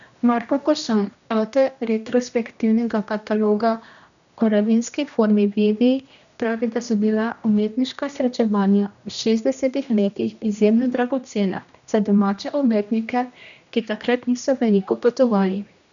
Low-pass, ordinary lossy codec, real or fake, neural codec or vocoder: 7.2 kHz; none; fake; codec, 16 kHz, 1 kbps, X-Codec, HuBERT features, trained on general audio